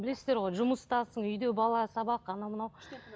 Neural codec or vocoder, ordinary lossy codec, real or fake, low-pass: none; none; real; none